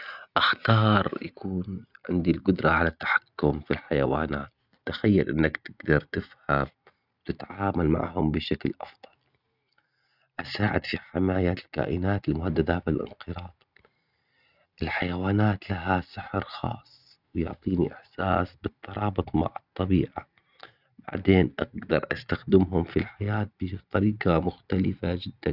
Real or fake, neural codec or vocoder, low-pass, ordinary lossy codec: real; none; 5.4 kHz; none